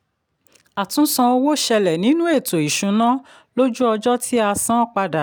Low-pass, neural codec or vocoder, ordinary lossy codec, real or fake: none; none; none; real